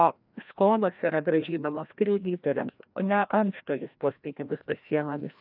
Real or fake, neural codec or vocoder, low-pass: fake; codec, 16 kHz, 1 kbps, FreqCodec, larger model; 5.4 kHz